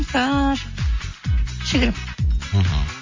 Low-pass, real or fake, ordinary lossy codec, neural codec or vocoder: 7.2 kHz; real; MP3, 32 kbps; none